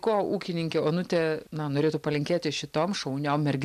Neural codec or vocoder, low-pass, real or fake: none; 14.4 kHz; real